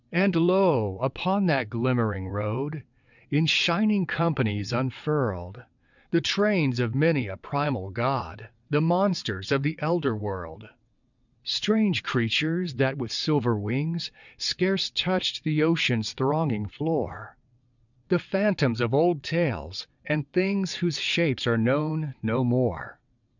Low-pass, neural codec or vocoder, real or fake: 7.2 kHz; vocoder, 22.05 kHz, 80 mel bands, WaveNeXt; fake